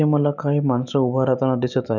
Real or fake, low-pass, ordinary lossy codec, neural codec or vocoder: real; 7.2 kHz; none; none